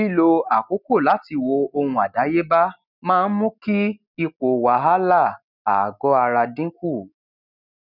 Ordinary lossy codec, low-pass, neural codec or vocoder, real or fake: none; 5.4 kHz; none; real